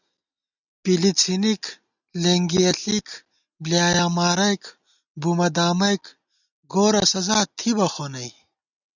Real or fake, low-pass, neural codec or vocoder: real; 7.2 kHz; none